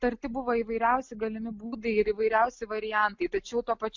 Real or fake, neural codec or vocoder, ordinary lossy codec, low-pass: real; none; MP3, 64 kbps; 7.2 kHz